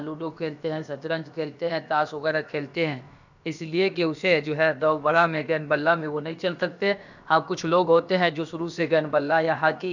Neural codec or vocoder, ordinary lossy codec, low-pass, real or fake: codec, 16 kHz, about 1 kbps, DyCAST, with the encoder's durations; none; 7.2 kHz; fake